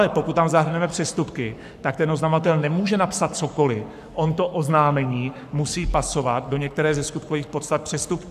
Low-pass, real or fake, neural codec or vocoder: 14.4 kHz; fake; codec, 44.1 kHz, 7.8 kbps, Pupu-Codec